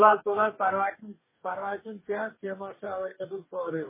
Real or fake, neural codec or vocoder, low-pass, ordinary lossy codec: fake; codec, 44.1 kHz, 2.6 kbps, DAC; 3.6 kHz; MP3, 16 kbps